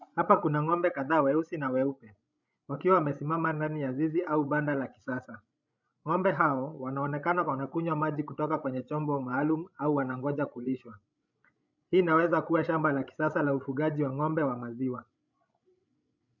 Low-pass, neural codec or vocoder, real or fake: 7.2 kHz; codec, 16 kHz, 16 kbps, FreqCodec, larger model; fake